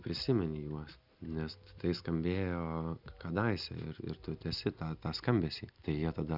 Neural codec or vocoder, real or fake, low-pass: none; real; 5.4 kHz